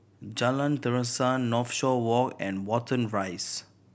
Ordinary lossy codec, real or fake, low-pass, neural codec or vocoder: none; real; none; none